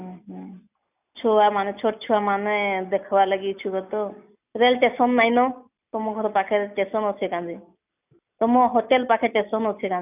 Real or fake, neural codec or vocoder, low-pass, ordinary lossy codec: real; none; 3.6 kHz; none